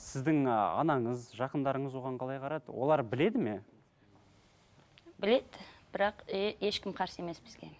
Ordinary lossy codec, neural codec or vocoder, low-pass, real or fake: none; none; none; real